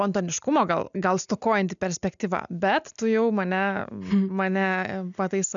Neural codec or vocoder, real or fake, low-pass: none; real; 7.2 kHz